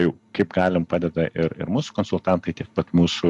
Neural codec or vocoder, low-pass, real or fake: none; 10.8 kHz; real